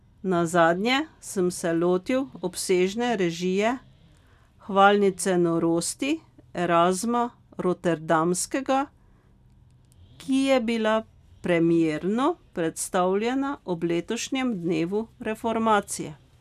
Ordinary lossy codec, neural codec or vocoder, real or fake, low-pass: none; none; real; 14.4 kHz